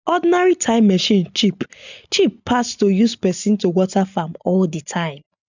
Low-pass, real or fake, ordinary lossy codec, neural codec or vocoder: 7.2 kHz; real; none; none